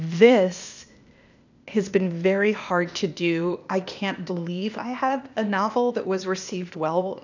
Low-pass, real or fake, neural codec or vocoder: 7.2 kHz; fake; codec, 16 kHz, 0.8 kbps, ZipCodec